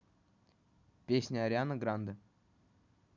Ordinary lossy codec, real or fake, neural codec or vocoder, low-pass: none; real; none; 7.2 kHz